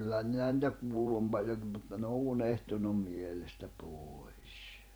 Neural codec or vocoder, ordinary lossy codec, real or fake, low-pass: vocoder, 44.1 kHz, 128 mel bands every 512 samples, BigVGAN v2; none; fake; none